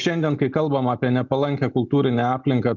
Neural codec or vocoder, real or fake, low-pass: none; real; 7.2 kHz